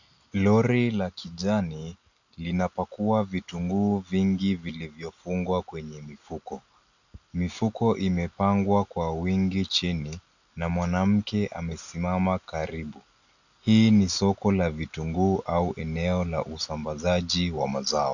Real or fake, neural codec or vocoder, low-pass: real; none; 7.2 kHz